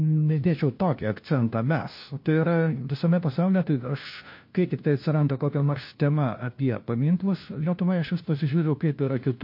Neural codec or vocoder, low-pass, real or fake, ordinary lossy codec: codec, 16 kHz, 1 kbps, FunCodec, trained on LibriTTS, 50 frames a second; 5.4 kHz; fake; MP3, 32 kbps